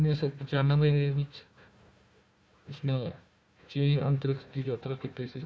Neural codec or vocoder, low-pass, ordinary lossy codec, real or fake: codec, 16 kHz, 1 kbps, FunCodec, trained on Chinese and English, 50 frames a second; none; none; fake